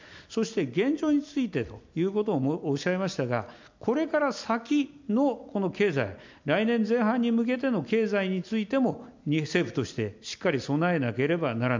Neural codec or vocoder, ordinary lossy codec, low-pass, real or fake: none; MP3, 64 kbps; 7.2 kHz; real